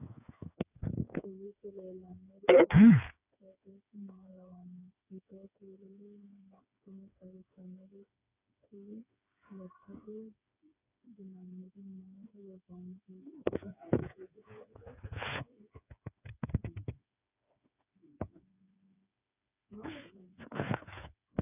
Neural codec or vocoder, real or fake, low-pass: codec, 16 kHz, 4 kbps, FreqCodec, smaller model; fake; 3.6 kHz